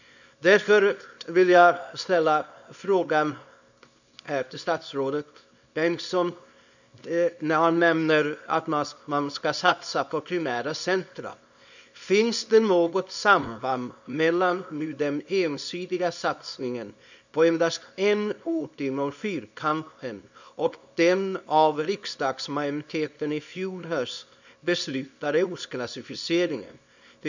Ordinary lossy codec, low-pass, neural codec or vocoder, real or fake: none; 7.2 kHz; codec, 24 kHz, 0.9 kbps, WavTokenizer, small release; fake